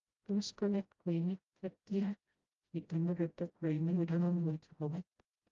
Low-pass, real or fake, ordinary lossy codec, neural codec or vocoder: 7.2 kHz; fake; Opus, 32 kbps; codec, 16 kHz, 0.5 kbps, FreqCodec, smaller model